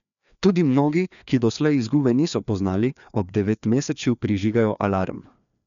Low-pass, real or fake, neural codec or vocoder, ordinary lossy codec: 7.2 kHz; fake; codec, 16 kHz, 2 kbps, FreqCodec, larger model; none